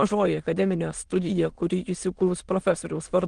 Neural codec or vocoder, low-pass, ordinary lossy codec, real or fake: autoencoder, 22.05 kHz, a latent of 192 numbers a frame, VITS, trained on many speakers; 9.9 kHz; Opus, 24 kbps; fake